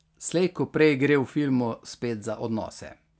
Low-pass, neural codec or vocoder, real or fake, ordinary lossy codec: none; none; real; none